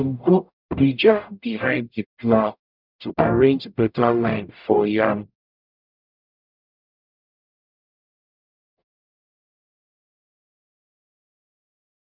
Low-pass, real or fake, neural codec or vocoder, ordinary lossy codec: 5.4 kHz; fake; codec, 44.1 kHz, 0.9 kbps, DAC; none